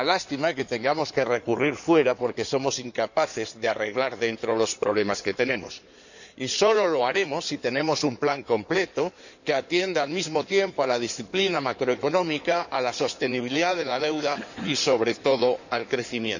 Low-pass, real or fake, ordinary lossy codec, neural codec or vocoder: 7.2 kHz; fake; none; codec, 16 kHz in and 24 kHz out, 2.2 kbps, FireRedTTS-2 codec